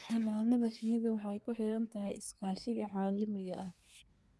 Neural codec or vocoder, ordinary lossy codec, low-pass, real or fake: codec, 24 kHz, 1 kbps, SNAC; none; none; fake